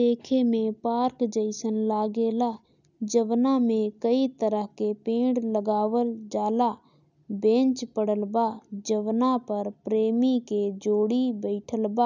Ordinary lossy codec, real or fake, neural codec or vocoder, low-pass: none; real; none; 7.2 kHz